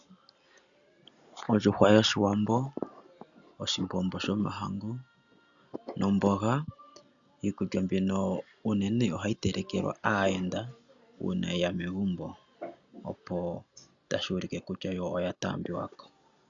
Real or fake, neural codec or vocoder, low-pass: real; none; 7.2 kHz